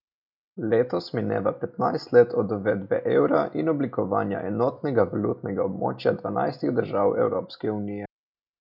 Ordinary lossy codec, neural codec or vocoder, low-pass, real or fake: none; none; 5.4 kHz; real